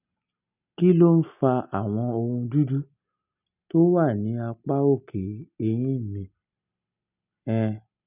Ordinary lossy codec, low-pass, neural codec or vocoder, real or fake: none; 3.6 kHz; none; real